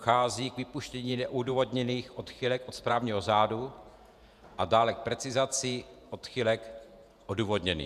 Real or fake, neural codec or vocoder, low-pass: fake; vocoder, 48 kHz, 128 mel bands, Vocos; 14.4 kHz